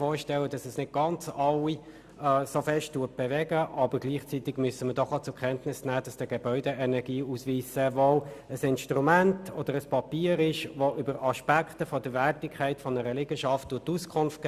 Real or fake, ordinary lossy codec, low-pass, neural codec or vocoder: real; Opus, 64 kbps; 14.4 kHz; none